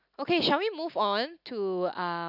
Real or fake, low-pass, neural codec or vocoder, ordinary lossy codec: real; 5.4 kHz; none; none